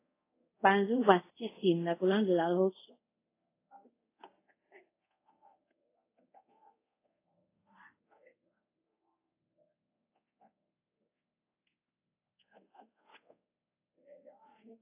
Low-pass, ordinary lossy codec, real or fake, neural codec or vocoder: 3.6 kHz; AAC, 24 kbps; fake; codec, 24 kHz, 0.5 kbps, DualCodec